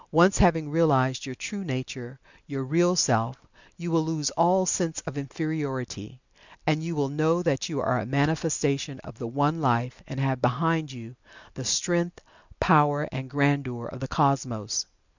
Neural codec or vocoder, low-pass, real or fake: none; 7.2 kHz; real